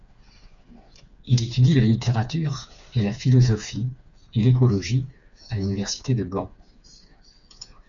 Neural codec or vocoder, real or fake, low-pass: codec, 16 kHz, 4 kbps, FreqCodec, smaller model; fake; 7.2 kHz